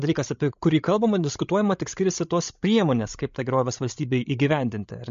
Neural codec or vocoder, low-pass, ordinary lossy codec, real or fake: codec, 16 kHz, 16 kbps, FreqCodec, larger model; 7.2 kHz; MP3, 48 kbps; fake